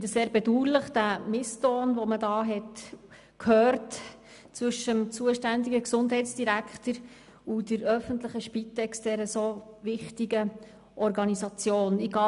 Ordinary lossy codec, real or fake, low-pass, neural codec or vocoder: none; real; 10.8 kHz; none